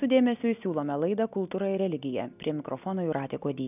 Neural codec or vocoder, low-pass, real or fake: none; 3.6 kHz; real